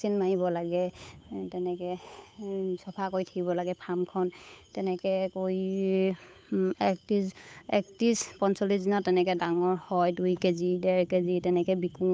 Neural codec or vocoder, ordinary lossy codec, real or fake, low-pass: codec, 16 kHz, 8 kbps, FunCodec, trained on Chinese and English, 25 frames a second; none; fake; none